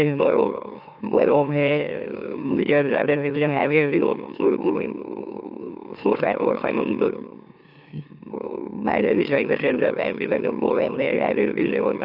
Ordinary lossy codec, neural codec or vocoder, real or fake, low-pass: AAC, 48 kbps; autoencoder, 44.1 kHz, a latent of 192 numbers a frame, MeloTTS; fake; 5.4 kHz